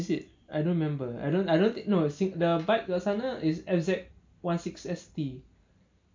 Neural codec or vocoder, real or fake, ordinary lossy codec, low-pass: none; real; MP3, 64 kbps; 7.2 kHz